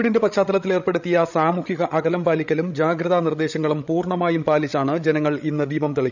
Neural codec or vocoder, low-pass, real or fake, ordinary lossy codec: codec, 16 kHz, 16 kbps, FreqCodec, larger model; 7.2 kHz; fake; none